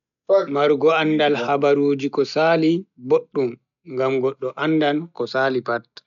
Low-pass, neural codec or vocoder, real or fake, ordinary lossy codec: 7.2 kHz; none; real; none